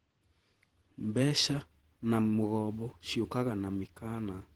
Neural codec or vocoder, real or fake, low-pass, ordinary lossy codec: vocoder, 48 kHz, 128 mel bands, Vocos; fake; 19.8 kHz; Opus, 16 kbps